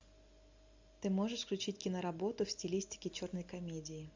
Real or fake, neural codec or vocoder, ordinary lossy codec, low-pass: real; none; MP3, 64 kbps; 7.2 kHz